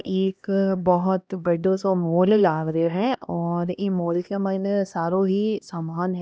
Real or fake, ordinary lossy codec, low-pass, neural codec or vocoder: fake; none; none; codec, 16 kHz, 1 kbps, X-Codec, HuBERT features, trained on LibriSpeech